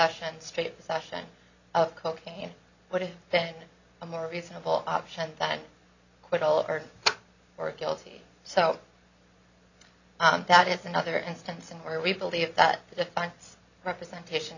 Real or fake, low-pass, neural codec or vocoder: real; 7.2 kHz; none